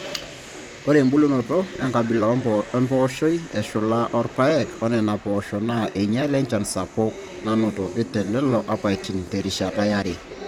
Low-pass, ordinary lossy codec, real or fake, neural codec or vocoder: 19.8 kHz; none; fake; vocoder, 44.1 kHz, 128 mel bands, Pupu-Vocoder